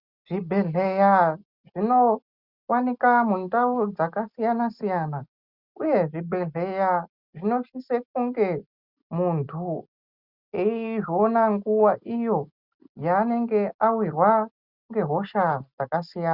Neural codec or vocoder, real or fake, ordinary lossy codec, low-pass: none; real; Opus, 64 kbps; 5.4 kHz